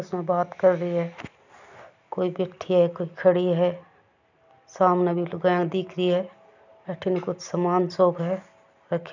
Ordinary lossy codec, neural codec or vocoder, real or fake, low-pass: none; none; real; 7.2 kHz